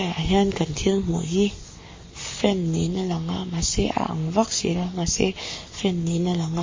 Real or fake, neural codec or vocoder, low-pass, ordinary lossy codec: fake; codec, 44.1 kHz, 7.8 kbps, Pupu-Codec; 7.2 kHz; MP3, 32 kbps